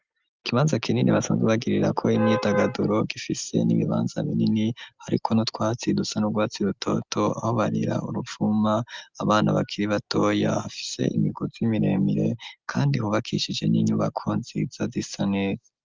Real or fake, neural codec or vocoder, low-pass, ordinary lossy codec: real; none; 7.2 kHz; Opus, 24 kbps